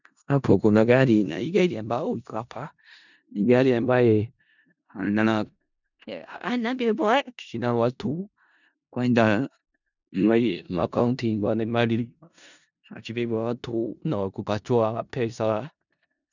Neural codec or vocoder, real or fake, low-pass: codec, 16 kHz in and 24 kHz out, 0.4 kbps, LongCat-Audio-Codec, four codebook decoder; fake; 7.2 kHz